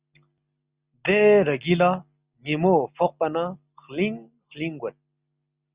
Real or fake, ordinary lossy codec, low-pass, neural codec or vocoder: real; Opus, 64 kbps; 3.6 kHz; none